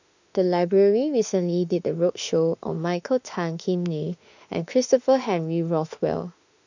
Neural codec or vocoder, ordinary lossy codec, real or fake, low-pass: autoencoder, 48 kHz, 32 numbers a frame, DAC-VAE, trained on Japanese speech; none; fake; 7.2 kHz